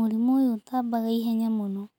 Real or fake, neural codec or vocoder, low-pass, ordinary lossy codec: real; none; 19.8 kHz; none